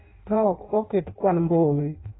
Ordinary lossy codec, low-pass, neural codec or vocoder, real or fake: AAC, 16 kbps; 7.2 kHz; codec, 16 kHz in and 24 kHz out, 0.6 kbps, FireRedTTS-2 codec; fake